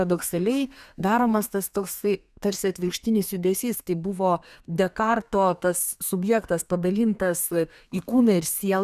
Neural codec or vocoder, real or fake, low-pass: codec, 32 kHz, 1.9 kbps, SNAC; fake; 14.4 kHz